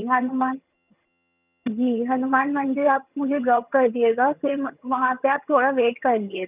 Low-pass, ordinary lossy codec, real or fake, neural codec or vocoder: 3.6 kHz; none; fake; vocoder, 22.05 kHz, 80 mel bands, HiFi-GAN